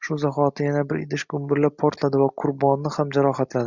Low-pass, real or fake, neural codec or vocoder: 7.2 kHz; real; none